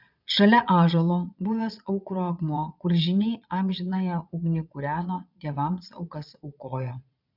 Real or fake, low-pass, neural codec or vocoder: fake; 5.4 kHz; vocoder, 22.05 kHz, 80 mel bands, Vocos